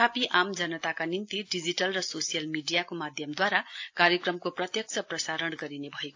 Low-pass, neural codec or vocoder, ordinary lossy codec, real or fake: 7.2 kHz; none; AAC, 48 kbps; real